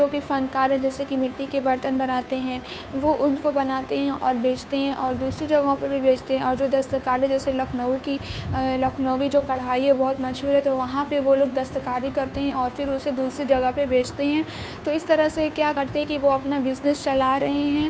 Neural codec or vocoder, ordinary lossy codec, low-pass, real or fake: codec, 16 kHz, 2 kbps, FunCodec, trained on Chinese and English, 25 frames a second; none; none; fake